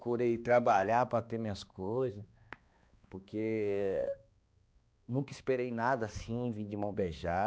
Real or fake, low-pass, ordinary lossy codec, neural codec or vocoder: fake; none; none; codec, 16 kHz, 2 kbps, X-Codec, HuBERT features, trained on balanced general audio